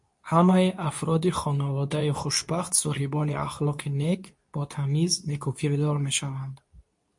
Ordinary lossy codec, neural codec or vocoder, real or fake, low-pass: MP3, 48 kbps; codec, 24 kHz, 0.9 kbps, WavTokenizer, medium speech release version 2; fake; 10.8 kHz